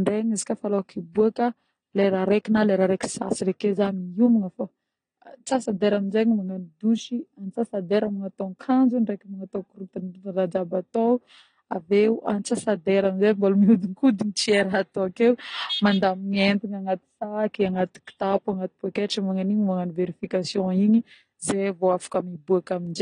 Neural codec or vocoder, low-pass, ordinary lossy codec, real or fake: none; 9.9 kHz; AAC, 32 kbps; real